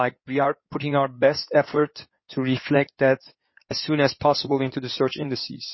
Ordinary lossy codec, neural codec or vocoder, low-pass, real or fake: MP3, 24 kbps; codec, 16 kHz in and 24 kHz out, 2.2 kbps, FireRedTTS-2 codec; 7.2 kHz; fake